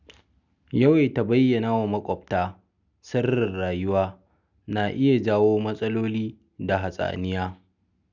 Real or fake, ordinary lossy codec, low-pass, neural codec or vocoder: real; none; 7.2 kHz; none